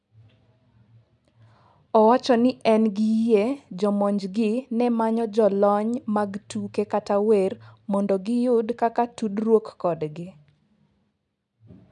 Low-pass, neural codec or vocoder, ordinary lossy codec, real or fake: 10.8 kHz; none; none; real